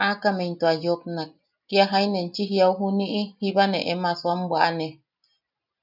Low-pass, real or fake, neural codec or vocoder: 5.4 kHz; real; none